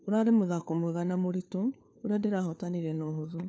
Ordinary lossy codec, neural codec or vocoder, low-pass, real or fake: none; codec, 16 kHz, 4 kbps, FunCodec, trained on LibriTTS, 50 frames a second; none; fake